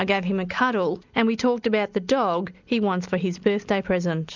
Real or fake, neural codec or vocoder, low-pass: real; none; 7.2 kHz